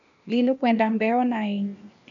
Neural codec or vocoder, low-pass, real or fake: codec, 16 kHz, 0.8 kbps, ZipCodec; 7.2 kHz; fake